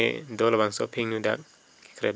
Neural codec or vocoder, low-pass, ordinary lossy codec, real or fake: none; none; none; real